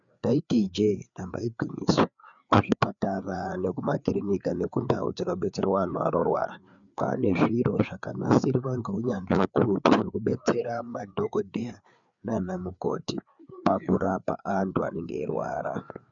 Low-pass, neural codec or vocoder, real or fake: 7.2 kHz; codec, 16 kHz, 4 kbps, FreqCodec, larger model; fake